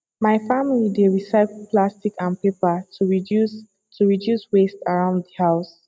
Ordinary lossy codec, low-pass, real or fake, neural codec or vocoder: none; none; real; none